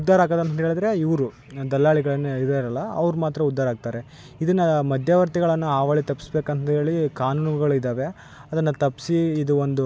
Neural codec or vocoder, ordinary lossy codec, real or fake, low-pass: none; none; real; none